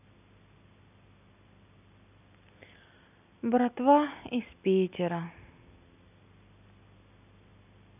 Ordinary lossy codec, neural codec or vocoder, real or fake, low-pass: none; none; real; 3.6 kHz